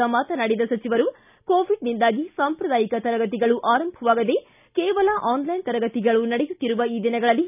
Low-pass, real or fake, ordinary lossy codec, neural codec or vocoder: 3.6 kHz; real; none; none